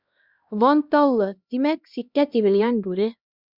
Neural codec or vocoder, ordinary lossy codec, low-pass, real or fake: codec, 16 kHz, 1 kbps, X-Codec, HuBERT features, trained on LibriSpeech; Opus, 64 kbps; 5.4 kHz; fake